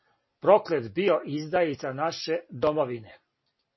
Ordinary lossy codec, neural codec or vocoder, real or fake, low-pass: MP3, 24 kbps; none; real; 7.2 kHz